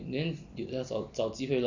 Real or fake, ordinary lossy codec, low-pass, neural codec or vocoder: real; none; 7.2 kHz; none